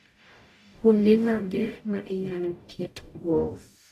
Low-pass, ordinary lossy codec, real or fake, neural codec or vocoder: 14.4 kHz; AAC, 64 kbps; fake; codec, 44.1 kHz, 0.9 kbps, DAC